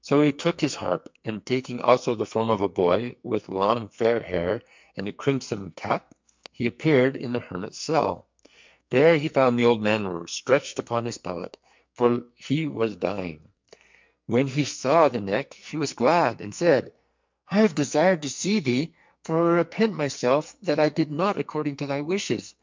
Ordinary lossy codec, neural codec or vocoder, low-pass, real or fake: MP3, 64 kbps; codec, 44.1 kHz, 2.6 kbps, SNAC; 7.2 kHz; fake